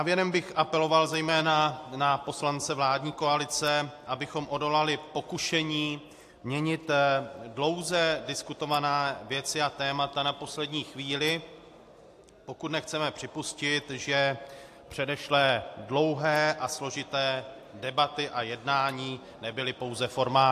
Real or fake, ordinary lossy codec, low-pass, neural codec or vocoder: real; AAC, 64 kbps; 14.4 kHz; none